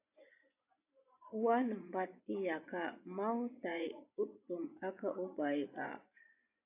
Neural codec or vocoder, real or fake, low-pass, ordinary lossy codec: vocoder, 24 kHz, 100 mel bands, Vocos; fake; 3.6 kHz; AAC, 32 kbps